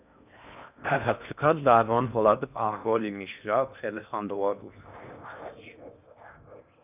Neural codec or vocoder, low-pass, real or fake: codec, 16 kHz in and 24 kHz out, 0.6 kbps, FocalCodec, streaming, 4096 codes; 3.6 kHz; fake